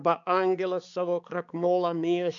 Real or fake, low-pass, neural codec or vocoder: fake; 7.2 kHz; codec, 16 kHz, 4 kbps, X-Codec, HuBERT features, trained on balanced general audio